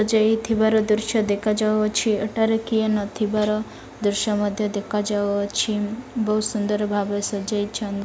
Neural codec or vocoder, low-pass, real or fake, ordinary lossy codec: none; none; real; none